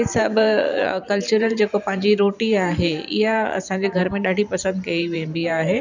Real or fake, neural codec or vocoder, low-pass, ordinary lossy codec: fake; vocoder, 44.1 kHz, 128 mel bands every 512 samples, BigVGAN v2; 7.2 kHz; none